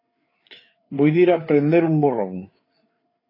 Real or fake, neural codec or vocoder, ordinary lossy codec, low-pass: fake; codec, 16 kHz, 4 kbps, FreqCodec, larger model; AAC, 24 kbps; 5.4 kHz